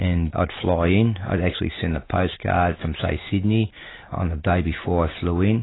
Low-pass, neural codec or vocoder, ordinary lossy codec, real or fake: 7.2 kHz; none; AAC, 16 kbps; real